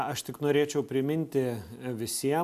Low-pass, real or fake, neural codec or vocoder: 14.4 kHz; real; none